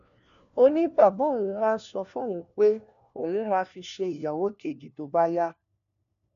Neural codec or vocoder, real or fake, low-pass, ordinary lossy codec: codec, 16 kHz, 1 kbps, FunCodec, trained on LibriTTS, 50 frames a second; fake; 7.2 kHz; none